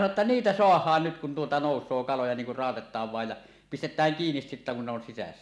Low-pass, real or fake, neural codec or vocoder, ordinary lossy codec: none; real; none; none